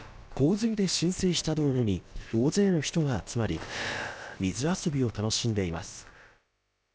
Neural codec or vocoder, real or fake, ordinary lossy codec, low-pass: codec, 16 kHz, about 1 kbps, DyCAST, with the encoder's durations; fake; none; none